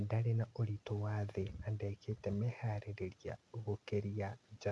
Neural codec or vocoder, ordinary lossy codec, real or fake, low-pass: none; none; real; none